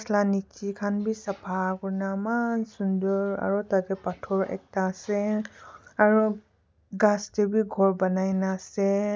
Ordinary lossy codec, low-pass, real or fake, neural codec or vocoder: none; none; real; none